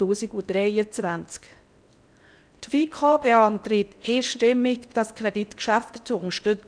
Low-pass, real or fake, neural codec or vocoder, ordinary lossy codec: 9.9 kHz; fake; codec, 16 kHz in and 24 kHz out, 0.6 kbps, FocalCodec, streaming, 4096 codes; none